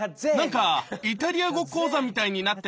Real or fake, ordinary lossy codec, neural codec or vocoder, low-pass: real; none; none; none